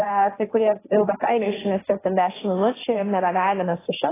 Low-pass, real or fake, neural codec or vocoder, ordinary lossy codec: 3.6 kHz; fake; codec, 16 kHz, 1.1 kbps, Voila-Tokenizer; AAC, 16 kbps